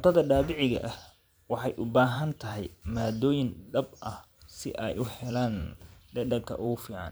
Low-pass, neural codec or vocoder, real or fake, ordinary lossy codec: none; vocoder, 44.1 kHz, 128 mel bands every 512 samples, BigVGAN v2; fake; none